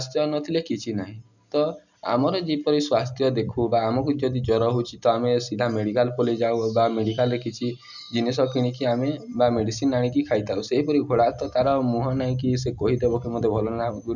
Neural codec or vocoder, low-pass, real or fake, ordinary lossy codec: none; 7.2 kHz; real; none